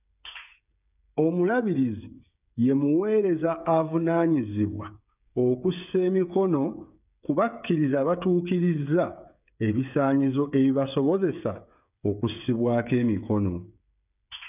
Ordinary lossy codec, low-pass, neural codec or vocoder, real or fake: none; 3.6 kHz; codec, 16 kHz, 8 kbps, FreqCodec, smaller model; fake